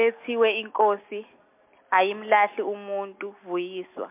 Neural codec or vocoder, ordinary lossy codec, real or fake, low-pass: none; MP3, 32 kbps; real; 3.6 kHz